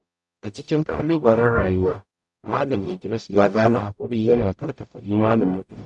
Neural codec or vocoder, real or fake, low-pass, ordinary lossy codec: codec, 44.1 kHz, 0.9 kbps, DAC; fake; 10.8 kHz; none